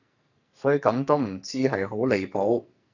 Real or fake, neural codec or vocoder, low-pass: fake; codec, 44.1 kHz, 2.6 kbps, SNAC; 7.2 kHz